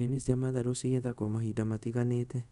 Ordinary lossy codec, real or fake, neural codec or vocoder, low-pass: none; fake; codec, 24 kHz, 0.5 kbps, DualCodec; 10.8 kHz